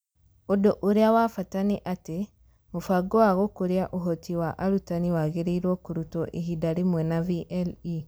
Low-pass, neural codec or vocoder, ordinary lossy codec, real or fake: none; none; none; real